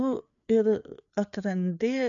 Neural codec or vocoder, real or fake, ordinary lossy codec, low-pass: codec, 16 kHz, 4 kbps, FreqCodec, larger model; fake; MP3, 96 kbps; 7.2 kHz